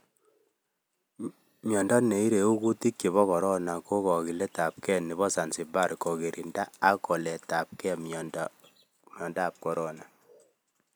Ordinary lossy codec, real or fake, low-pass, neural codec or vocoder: none; real; none; none